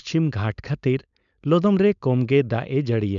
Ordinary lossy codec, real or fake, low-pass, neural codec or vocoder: MP3, 96 kbps; fake; 7.2 kHz; codec, 16 kHz, 4.8 kbps, FACodec